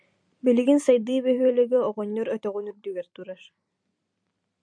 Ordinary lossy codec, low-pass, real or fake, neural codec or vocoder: MP3, 64 kbps; 9.9 kHz; fake; vocoder, 44.1 kHz, 128 mel bands every 256 samples, BigVGAN v2